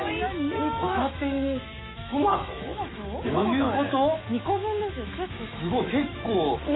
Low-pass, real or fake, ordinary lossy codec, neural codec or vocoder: 7.2 kHz; fake; AAC, 16 kbps; autoencoder, 48 kHz, 128 numbers a frame, DAC-VAE, trained on Japanese speech